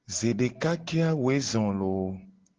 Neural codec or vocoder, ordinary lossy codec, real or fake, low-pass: none; Opus, 16 kbps; real; 7.2 kHz